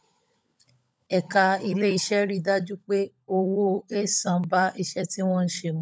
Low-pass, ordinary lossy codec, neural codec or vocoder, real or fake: none; none; codec, 16 kHz, 16 kbps, FunCodec, trained on LibriTTS, 50 frames a second; fake